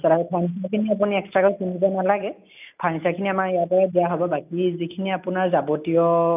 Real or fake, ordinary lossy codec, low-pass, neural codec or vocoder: real; none; 3.6 kHz; none